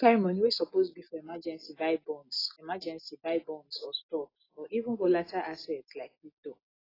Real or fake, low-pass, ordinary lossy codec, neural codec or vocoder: real; 5.4 kHz; AAC, 24 kbps; none